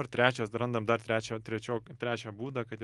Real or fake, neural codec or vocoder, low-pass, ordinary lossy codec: real; none; 10.8 kHz; Opus, 24 kbps